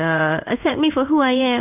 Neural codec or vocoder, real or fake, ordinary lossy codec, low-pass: codec, 16 kHz in and 24 kHz out, 1 kbps, XY-Tokenizer; fake; none; 3.6 kHz